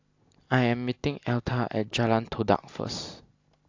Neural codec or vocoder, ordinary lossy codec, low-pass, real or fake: none; AAC, 48 kbps; 7.2 kHz; real